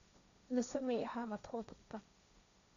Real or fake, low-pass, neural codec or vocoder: fake; 7.2 kHz; codec, 16 kHz, 1.1 kbps, Voila-Tokenizer